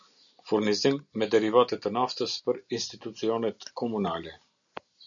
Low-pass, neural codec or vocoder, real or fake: 7.2 kHz; none; real